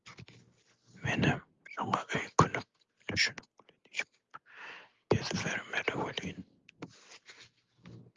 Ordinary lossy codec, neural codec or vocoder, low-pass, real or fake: Opus, 32 kbps; none; 7.2 kHz; real